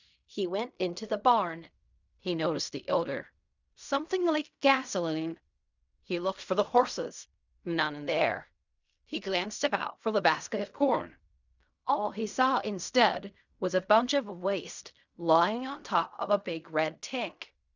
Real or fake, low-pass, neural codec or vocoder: fake; 7.2 kHz; codec, 16 kHz in and 24 kHz out, 0.4 kbps, LongCat-Audio-Codec, fine tuned four codebook decoder